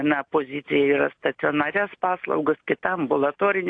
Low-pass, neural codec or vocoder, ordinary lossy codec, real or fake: 9.9 kHz; none; AAC, 48 kbps; real